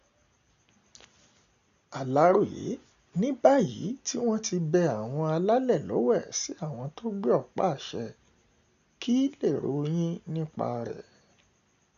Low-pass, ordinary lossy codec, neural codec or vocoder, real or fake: 7.2 kHz; none; none; real